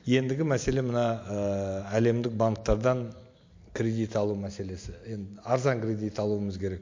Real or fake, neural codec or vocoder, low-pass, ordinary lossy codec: real; none; 7.2 kHz; MP3, 48 kbps